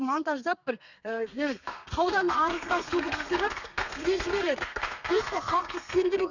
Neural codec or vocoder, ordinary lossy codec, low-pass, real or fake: codec, 32 kHz, 1.9 kbps, SNAC; none; 7.2 kHz; fake